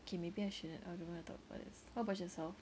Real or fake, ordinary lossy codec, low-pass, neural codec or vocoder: real; none; none; none